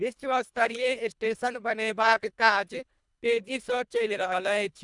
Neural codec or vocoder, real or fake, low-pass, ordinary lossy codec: codec, 24 kHz, 1.5 kbps, HILCodec; fake; 10.8 kHz; none